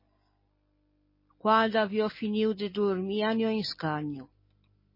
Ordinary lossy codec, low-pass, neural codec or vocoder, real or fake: MP3, 24 kbps; 5.4 kHz; none; real